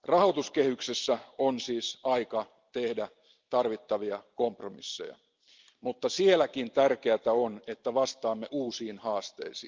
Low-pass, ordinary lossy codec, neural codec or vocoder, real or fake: 7.2 kHz; Opus, 32 kbps; none; real